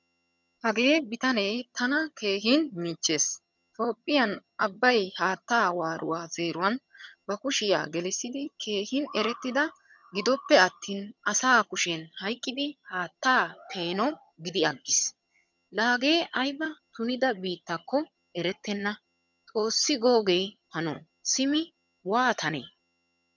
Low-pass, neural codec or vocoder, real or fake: 7.2 kHz; vocoder, 22.05 kHz, 80 mel bands, HiFi-GAN; fake